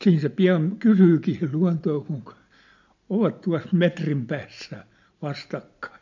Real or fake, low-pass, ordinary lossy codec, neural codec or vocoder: real; 7.2 kHz; MP3, 48 kbps; none